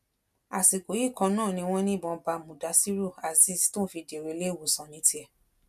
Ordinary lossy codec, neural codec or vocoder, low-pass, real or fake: MP3, 96 kbps; none; 14.4 kHz; real